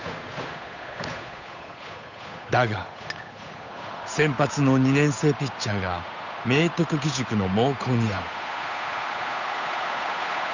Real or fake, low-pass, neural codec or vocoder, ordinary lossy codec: fake; 7.2 kHz; codec, 16 kHz, 8 kbps, FunCodec, trained on Chinese and English, 25 frames a second; none